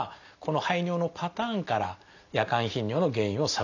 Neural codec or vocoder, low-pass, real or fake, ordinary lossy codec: none; 7.2 kHz; real; none